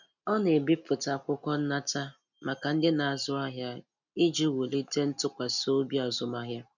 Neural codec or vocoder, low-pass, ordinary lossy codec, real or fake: none; 7.2 kHz; none; real